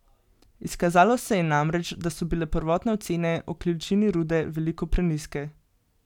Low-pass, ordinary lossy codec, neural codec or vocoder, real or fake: 19.8 kHz; none; none; real